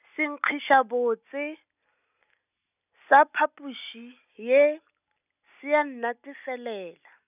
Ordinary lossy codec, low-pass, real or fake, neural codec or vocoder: none; 3.6 kHz; real; none